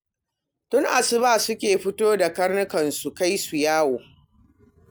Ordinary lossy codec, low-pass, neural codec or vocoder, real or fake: none; none; none; real